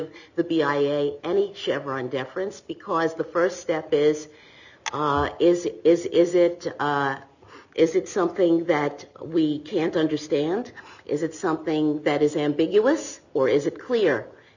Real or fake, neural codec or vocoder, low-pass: real; none; 7.2 kHz